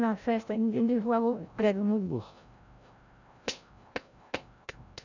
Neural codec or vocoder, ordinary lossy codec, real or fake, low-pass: codec, 16 kHz, 0.5 kbps, FreqCodec, larger model; none; fake; 7.2 kHz